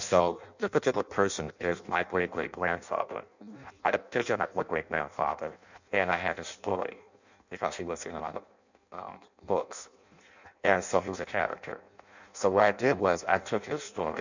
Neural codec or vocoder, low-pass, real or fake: codec, 16 kHz in and 24 kHz out, 0.6 kbps, FireRedTTS-2 codec; 7.2 kHz; fake